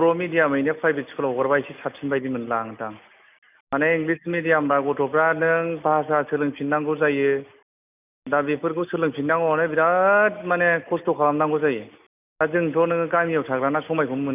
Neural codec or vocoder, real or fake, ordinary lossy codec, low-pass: none; real; none; 3.6 kHz